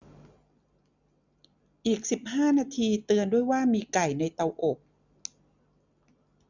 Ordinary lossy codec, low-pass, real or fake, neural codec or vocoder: none; 7.2 kHz; real; none